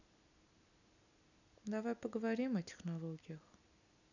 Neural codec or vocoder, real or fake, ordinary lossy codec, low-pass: none; real; none; 7.2 kHz